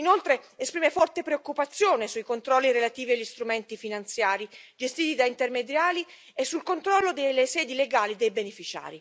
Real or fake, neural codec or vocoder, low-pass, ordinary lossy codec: real; none; none; none